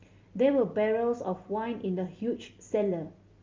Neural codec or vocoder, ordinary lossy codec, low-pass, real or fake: none; Opus, 24 kbps; 7.2 kHz; real